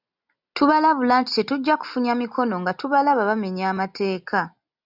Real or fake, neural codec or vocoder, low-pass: real; none; 5.4 kHz